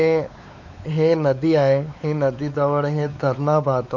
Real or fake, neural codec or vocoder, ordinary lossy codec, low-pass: fake; codec, 16 kHz, 4 kbps, X-Codec, WavLM features, trained on Multilingual LibriSpeech; none; 7.2 kHz